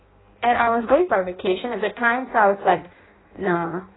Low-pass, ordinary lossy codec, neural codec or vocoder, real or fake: 7.2 kHz; AAC, 16 kbps; codec, 16 kHz in and 24 kHz out, 0.6 kbps, FireRedTTS-2 codec; fake